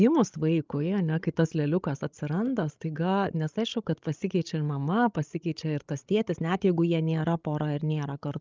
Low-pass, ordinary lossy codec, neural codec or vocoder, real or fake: 7.2 kHz; Opus, 24 kbps; codec, 16 kHz, 16 kbps, FreqCodec, larger model; fake